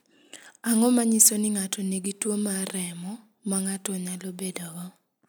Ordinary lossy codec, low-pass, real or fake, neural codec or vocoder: none; none; real; none